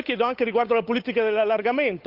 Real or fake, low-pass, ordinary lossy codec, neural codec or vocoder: fake; 5.4 kHz; Opus, 32 kbps; codec, 16 kHz, 8 kbps, FunCodec, trained on Chinese and English, 25 frames a second